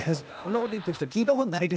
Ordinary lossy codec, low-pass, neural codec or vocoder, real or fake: none; none; codec, 16 kHz, 0.8 kbps, ZipCodec; fake